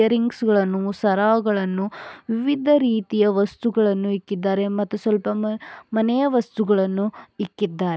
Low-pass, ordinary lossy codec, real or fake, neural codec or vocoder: none; none; real; none